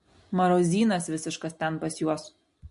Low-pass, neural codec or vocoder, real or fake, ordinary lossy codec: 10.8 kHz; none; real; MP3, 48 kbps